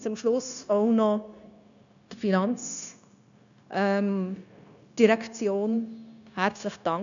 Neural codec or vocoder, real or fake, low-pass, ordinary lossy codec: codec, 16 kHz, 0.9 kbps, LongCat-Audio-Codec; fake; 7.2 kHz; none